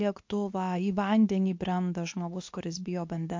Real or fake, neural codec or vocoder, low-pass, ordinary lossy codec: fake; codec, 24 kHz, 0.9 kbps, WavTokenizer, medium speech release version 2; 7.2 kHz; MP3, 48 kbps